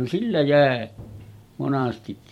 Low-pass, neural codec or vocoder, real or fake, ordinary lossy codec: 19.8 kHz; vocoder, 44.1 kHz, 128 mel bands every 256 samples, BigVGAN v2; fake; MP3, 64 kbps